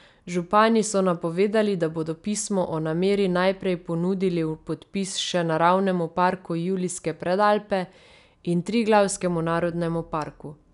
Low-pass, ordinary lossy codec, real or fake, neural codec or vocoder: 10.8 kHz; none; real; none